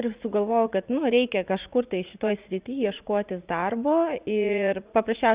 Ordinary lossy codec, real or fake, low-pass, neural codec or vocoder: Opus, 64 kbps; fake; 3.6 kHz; vocoder, 44.1 kHz, 80 mel bands, Vocos